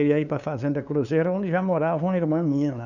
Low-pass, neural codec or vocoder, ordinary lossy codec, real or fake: 7.2 kHz; codec, 16 kHz, 2 kbps, FunCodec, trained on LibriTTS, 25 frames a second; none; fake